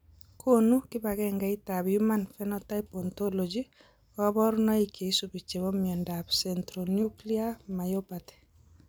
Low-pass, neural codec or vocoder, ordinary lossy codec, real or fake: none; none; none; real